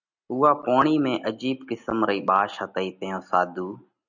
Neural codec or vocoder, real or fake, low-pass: none; real; 7.2 kHz